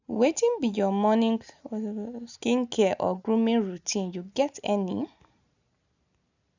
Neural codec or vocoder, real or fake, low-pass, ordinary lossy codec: none; real; 7.2 kHz; none